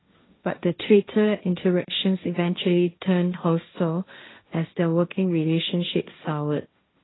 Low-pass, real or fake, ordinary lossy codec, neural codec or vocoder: 7.2 kHz; fake; AAC, 16 kbps; codec, 16 kHz, 1.1 kbps, Voila-Tokenizer